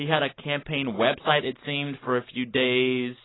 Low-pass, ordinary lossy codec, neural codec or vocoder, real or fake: 7.2 kHz; AAC, 16 kbps; none; real